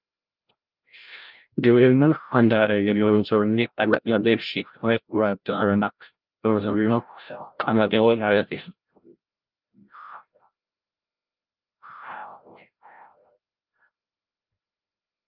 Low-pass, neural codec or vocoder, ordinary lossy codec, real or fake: 5.4 kHz; codec, 16 kHz, 0.5 kbps, FreqCodec, larger model; Opus, 32 kbps; fake